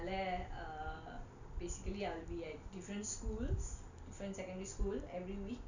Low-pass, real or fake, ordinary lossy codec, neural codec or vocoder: 7.2 kHz; real; none; none